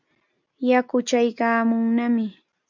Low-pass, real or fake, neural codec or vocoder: 7.2 kHz; real; none